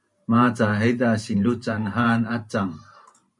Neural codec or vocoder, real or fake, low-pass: vocoder, 44.1 kHz, 128 mel bands every 512 samples, BigVGAN v2; fake; 10.8 kHz